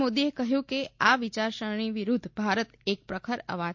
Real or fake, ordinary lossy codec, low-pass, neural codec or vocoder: real; MP3, 48 kbps; 7.2 kHz; none